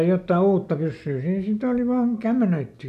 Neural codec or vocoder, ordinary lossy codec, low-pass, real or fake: none; none; 14.4 kHz; real